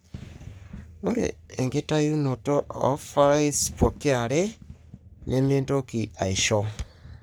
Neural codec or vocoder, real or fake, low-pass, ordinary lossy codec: codec, 44.1 kHz, 3.4 kbps, Pupu-Codec; fake; none; none